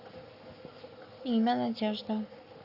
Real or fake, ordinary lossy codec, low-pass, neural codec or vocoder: fake; Opus, 64 kbps; 5.4 kHz; codec, 44.1 kHz, 7.8 kbps, Pupu-Codec